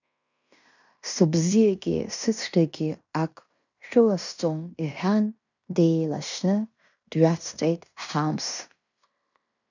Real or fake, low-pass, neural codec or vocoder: fake; 7.2 kHz; codec, 16 kHz in and 24 kHz out, 0.9 kbps, LongCat-Audio-Codec, fine tuned four codebook decoder